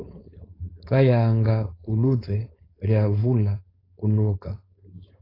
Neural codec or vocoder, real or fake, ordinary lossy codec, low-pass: codec, 16 kHz, 4.8 kbps, FACodec; fake; AAC, 24 kbps; 5.4 kHz